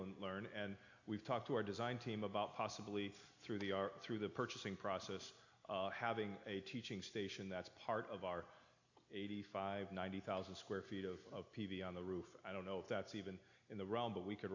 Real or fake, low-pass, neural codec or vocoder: real; 7.2 kHz; none